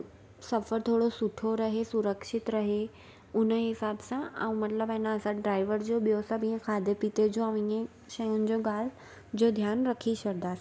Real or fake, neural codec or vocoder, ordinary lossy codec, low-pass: real; none; none; none